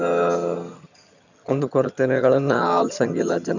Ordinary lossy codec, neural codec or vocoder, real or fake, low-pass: none; vocoder, 22.05 kHz, 80 mel bands, HiFi-GAN; fake; 7.2 kHz